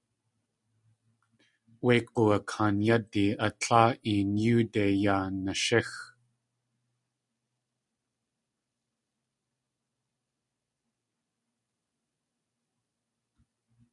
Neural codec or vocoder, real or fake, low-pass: none; real; 10.8 kHz